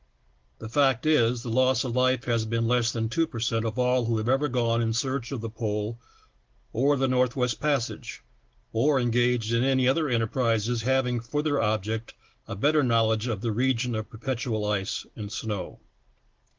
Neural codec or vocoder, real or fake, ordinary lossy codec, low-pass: none; real; Opus, 16 kbps; 7.2 kHz